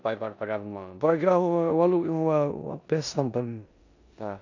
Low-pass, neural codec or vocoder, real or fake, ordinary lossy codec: 7.2 kHz; codec, 16 kHz in and 24 kHz out, 0.9 kbps, LongCat-Audio-Codec, four codebook decoder; fake; none